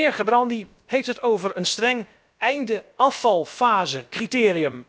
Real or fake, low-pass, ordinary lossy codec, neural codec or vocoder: fake; none; none; codec, 16 kHz, about 1 kbps, DyCAST, with the encoder's durations